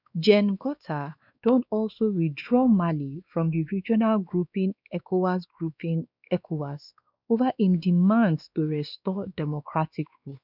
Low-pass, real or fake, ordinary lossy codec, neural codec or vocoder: 5.4 kHz; fake; none; codec, 16 kHz, 2 kbps, X-Codec, WavLM features, trained on Multilingual LibriSpeech